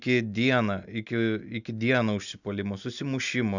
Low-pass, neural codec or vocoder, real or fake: 7.2 kHz; none; real